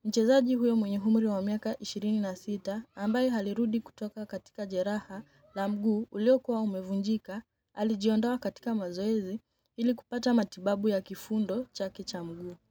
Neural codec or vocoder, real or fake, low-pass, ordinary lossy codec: none; real; 19.8 kHz; none